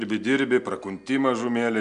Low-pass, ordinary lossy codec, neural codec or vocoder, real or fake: 9.9 kHz; AAC, 96 kbps; none; real